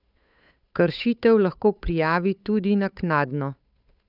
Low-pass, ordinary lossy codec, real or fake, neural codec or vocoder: 5.4 kHz; none; fake; codec, 16 kHz, 8 kbps, FunCodec, trained on Chinese and English, 25 frames a second